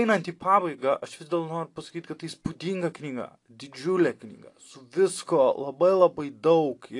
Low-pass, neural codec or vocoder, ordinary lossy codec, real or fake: 10.8 kHz; none; AAC, 48 kbps; real